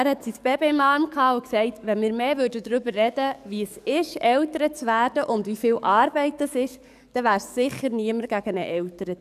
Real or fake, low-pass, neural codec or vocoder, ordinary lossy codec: fake; 14.4 kHz; codec, 44.1 kHz, 7.8 kbps, DAC; none